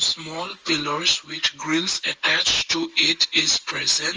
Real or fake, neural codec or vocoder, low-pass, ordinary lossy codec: real; none; 7.2 kHz; Opus, 24 kbps